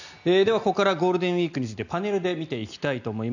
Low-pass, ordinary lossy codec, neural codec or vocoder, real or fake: 7.2 kHz; none; none; real